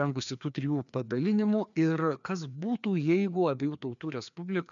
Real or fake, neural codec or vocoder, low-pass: fake; codec, 16 kHz, 2 kbps, FreqCodec, larger model; 7.2 kHz